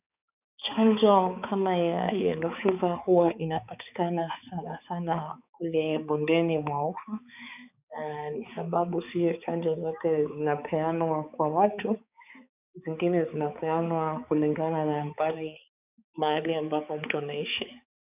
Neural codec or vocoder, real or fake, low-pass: codec, 16 kHz, 4 kbps, X-Codec, HuBERT features, trained on balanced general audio; fake; 3.6 kHz